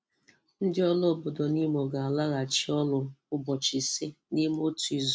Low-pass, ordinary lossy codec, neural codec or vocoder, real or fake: none; none; none; real